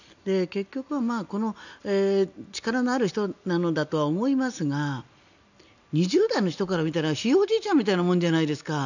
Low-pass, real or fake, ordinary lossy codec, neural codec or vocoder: 7.2 kHz; real; none; none